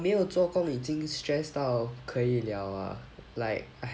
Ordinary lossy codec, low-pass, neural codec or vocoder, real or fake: none; none; none; real